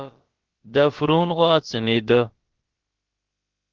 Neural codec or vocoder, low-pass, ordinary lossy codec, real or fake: codec, 16 kHz, about 1 kbps, DyCAST, with the encoder's durations; 7.2 kHz; Opus, 16 kbps; fake